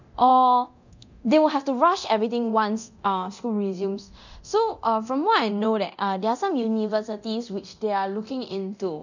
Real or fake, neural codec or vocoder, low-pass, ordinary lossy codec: fake; codec, 24 kHz, 0.9 kbps, DualCodec; 7.2 kHz; none